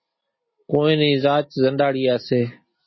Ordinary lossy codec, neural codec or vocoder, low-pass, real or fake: MP3, 24 kbps; none; 7.2 kHz; real